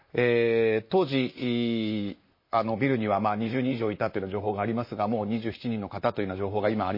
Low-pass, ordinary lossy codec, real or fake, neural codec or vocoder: 5.4 kHz; MP3, 24 kbps; fake; vocoder, 44.1 kHz, 128 mel bands, Pupu-Vocoder